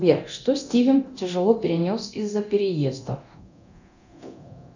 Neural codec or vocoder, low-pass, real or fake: codec, 24 kHz, 0.9 kbps, DualCodec; 7.2 kHz; fake